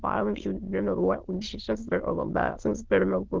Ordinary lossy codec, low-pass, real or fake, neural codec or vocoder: Opus, 16 kbps; 7.2 kHz; fake; autoencoder, 22.05 kHz, a latent of 192 numbers a frame, VITS, trained on many speakers